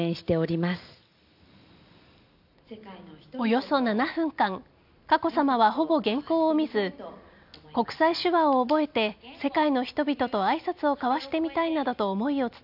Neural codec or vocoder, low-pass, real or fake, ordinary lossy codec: none; 5.4 kHz; real; none